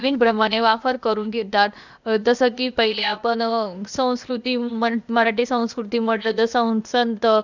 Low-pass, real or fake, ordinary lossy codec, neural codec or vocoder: 7.2 kHz; fake; none; codec, 16 kHz, 0.8 kbps, ZipCodec